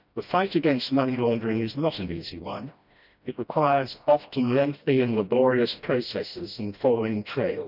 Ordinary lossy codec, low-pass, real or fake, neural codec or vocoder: none; 5.4 kHz; fake; codec, 16 kHz, 1 kbps, FreqCodec, smaller model